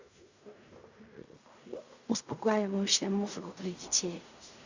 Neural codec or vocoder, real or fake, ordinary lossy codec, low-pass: codec, 16 kHz in and 24 kHz out, 0.4 kbps, LongCat-Audio-Codec, fine tuned four codebook decoder; fake; Opus, 64 kbps; 7.2 kHz